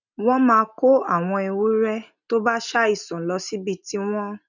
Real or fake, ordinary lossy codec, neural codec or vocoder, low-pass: real; none; none; 7.2 kHz